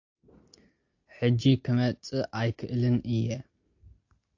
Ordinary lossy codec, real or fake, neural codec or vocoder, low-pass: AAC, 48 kbps; real; none; 7.2 kHz